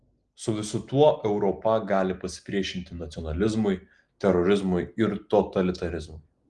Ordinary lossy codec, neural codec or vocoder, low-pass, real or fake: Opus, 24 kbps; none; 10.8 kHz; real